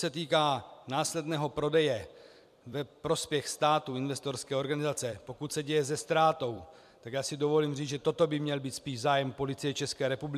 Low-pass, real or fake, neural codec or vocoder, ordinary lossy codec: 14.4 kHz; fake; vocoder, 44.1 kHz, 128 mel bands every 256 samples, BigVGAN v2; MP3, 96 kbps